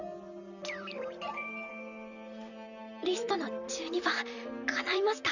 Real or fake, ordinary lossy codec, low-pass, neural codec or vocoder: fake; none; 7.2 kHz; vocoder, 44.1 kHz, 128 mel bands, Pupu-Vocoder